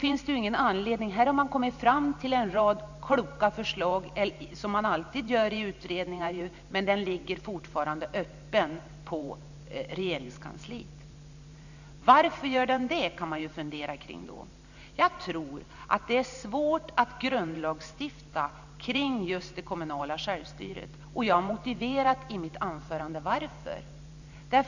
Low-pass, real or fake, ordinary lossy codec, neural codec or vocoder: 7.2 kHz; fake; none; vocoder, 44.1 kHz, 128 mel bands every 512 samples, BigVGAN v2